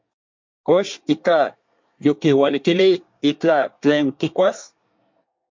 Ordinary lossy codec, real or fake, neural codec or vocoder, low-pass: MP3, 48 kbps; fake; codec, 24 kHz, 1 kbps, SNAC; 7.2 kHz